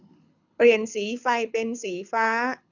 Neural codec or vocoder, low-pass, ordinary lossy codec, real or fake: codec, 24 kHz, 6 kbps, HILCodec; 7.2 kHz; none; fake